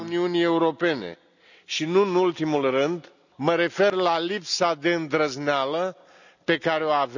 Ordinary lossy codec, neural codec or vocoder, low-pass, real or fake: none; none; 7.2 kHz; real